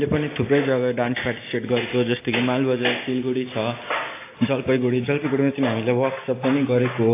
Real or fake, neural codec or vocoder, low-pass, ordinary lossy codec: real; none; 3.6 kHz; AAC, 24 kbps